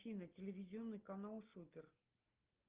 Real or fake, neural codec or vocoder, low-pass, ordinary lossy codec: real; none; 3.6 kHz; Opus, 32 kbps